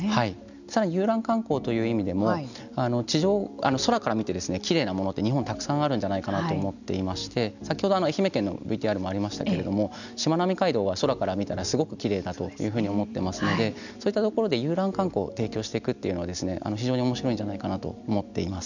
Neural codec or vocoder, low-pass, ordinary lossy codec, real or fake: none; 7.2 kHz; none; real